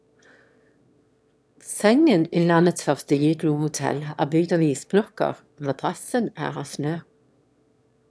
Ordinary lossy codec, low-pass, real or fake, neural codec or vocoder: none; none; fake; autoencoder, 22.05 kHz, a latent of 192 numbers a frame, VITS, trained on one speaker